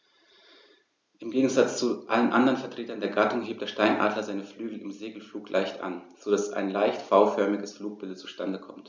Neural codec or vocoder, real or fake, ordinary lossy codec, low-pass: none; real; none; none